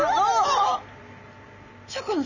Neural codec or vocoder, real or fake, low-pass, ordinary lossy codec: none; real; 7.2 kHz; none